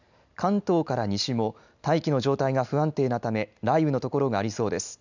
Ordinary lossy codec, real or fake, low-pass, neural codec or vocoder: none; real; 7.2 kHz; none